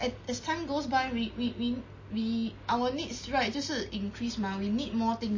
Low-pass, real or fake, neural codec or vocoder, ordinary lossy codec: 7.2 kHz; real; none; MP3, 32 kbps